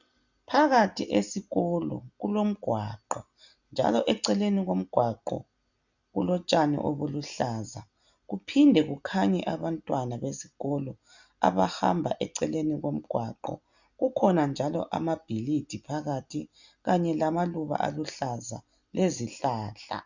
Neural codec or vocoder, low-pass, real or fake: none; 7.2 kHz; real